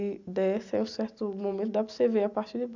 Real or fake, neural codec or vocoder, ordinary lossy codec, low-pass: real; none; none; 7.2 kHz